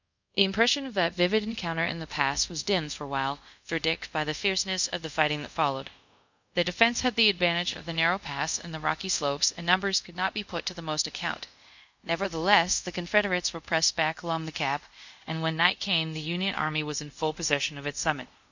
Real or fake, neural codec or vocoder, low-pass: fake; codec, 24 kHz, 0.5 kbps, DualCodec; 7.2 kHz